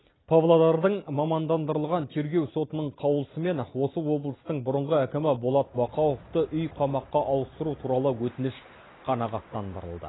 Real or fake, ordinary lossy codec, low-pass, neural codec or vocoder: real; AAC, 16 kbps; 7.2 kHz; none